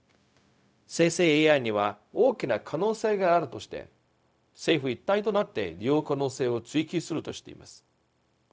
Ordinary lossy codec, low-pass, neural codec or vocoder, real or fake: none; none; codec, 16 kHz, 0.4 kbps, LongCat-Audio-Codec; fake